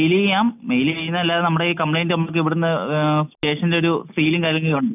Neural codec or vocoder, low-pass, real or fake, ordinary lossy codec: none; 3.6 kHz; real; none